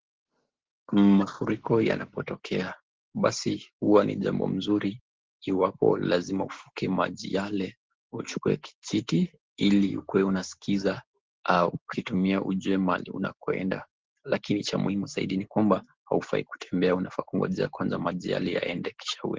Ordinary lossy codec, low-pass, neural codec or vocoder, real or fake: Opus, 16 kbps; 7.2 kHz; none; real